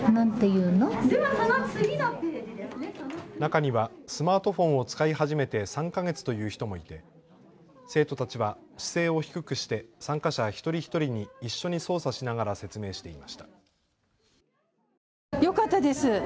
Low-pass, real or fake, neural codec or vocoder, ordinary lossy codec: none; real; none; none